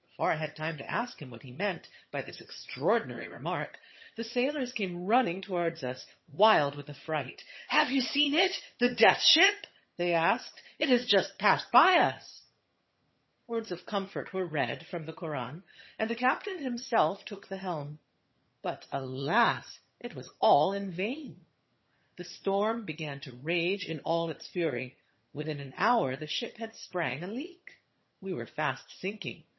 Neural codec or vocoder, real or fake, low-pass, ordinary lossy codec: vocoder, 22.05 kHz, 80 mel bands, HiFi-GAN; fake; 7.2 kHz; MP3, 24 kbps